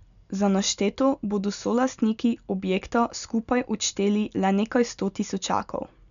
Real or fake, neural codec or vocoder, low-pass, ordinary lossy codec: real; none; 7.2 kHz; none